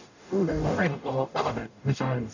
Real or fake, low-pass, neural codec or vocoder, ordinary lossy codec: fake; 7.2 kHz; codec, 44.1 kHz, 0.9 kbps, DAC; none